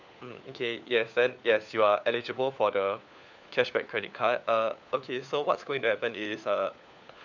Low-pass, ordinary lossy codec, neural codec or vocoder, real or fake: 7.2 kHz; none; codec, 16 kHz, 4 kbps, FunCodec, trained on LibriTTS, 50 frames a second; fake